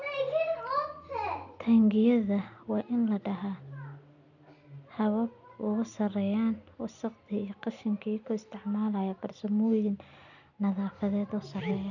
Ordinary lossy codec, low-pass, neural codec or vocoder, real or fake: none; 7.2 kHz; none; real